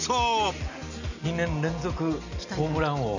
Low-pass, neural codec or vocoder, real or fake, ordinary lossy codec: 7.2 kHz; none; real; none